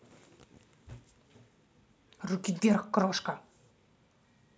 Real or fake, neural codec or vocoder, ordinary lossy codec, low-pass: real; none; none; none